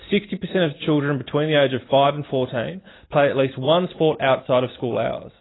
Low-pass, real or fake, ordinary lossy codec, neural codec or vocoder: 7.2 kHz; real; AAC, 16 kbps; none